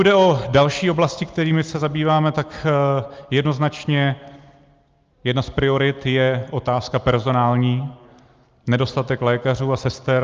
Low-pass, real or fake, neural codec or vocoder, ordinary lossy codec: 7.2 kHz; real; none; Opus, 24 kbps